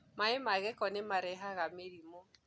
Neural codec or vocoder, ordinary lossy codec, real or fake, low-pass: none; none; real; none